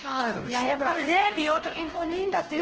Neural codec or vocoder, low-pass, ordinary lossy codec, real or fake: codec, 16 kHz, 1 kbps, X-Codec, WavLM features, trained on Multilingual LibriSpeech; 7.2 kHz; Opus, 16 kbps; fake